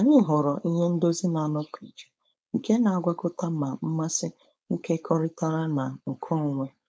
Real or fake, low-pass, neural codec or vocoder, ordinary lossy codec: fake; none; codec, 16 kHz, 4.8 kbps, FACodec; none